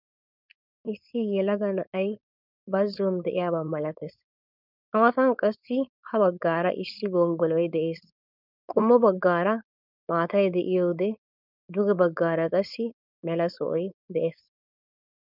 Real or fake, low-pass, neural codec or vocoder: fake; 5.4 kHz; codec, 16 kHz, 4.8 kbps, FACodec